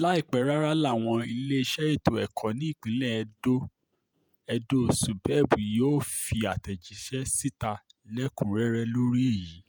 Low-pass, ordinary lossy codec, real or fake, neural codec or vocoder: none; none; fake; vocoder, 48 kHz, 128 mel bands, Vocos